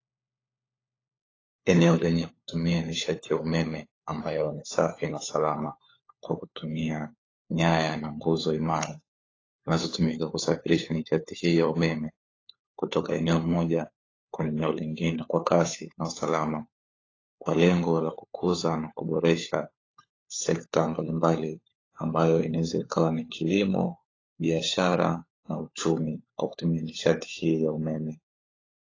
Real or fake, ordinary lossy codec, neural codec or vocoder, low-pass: fake; AAC, 32 kbps; codec, 16 kHz, 4 kbps, FunCodec, trained on LibriTTS, 50 frames a second; 7.2 kHz